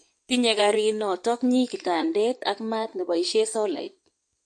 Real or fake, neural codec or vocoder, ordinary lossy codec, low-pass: fake; codec, 16 kHz in and 24 kHz out, 2.2 kbps, FireRedTTS-2 codec; MP3, 48 kbps; 9.9 kHz